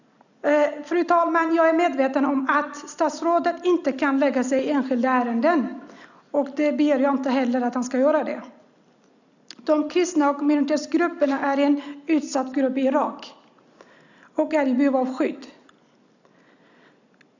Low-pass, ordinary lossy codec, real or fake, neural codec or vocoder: 7.2 kHz; none; real; none